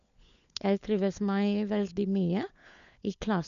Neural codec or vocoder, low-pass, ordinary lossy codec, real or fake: codec, 16 kHz, 4 kbps, FunCodec, trained on LibriTTS, 50 frames a second; 7.2 kHz; MP3, 96 kbps; fake